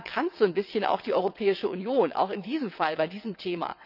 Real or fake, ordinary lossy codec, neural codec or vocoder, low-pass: fake; none; vocoder, 22.05 kHz, 80 mel bands, WaveNeXt; 5.4 kHz